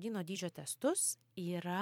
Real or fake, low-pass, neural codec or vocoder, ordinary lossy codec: fake; 19.8 kHz; vocoder, 44.1 kHz, 128 mel bands every 256 samples, BigVGAN v2; MP3, 96 kbps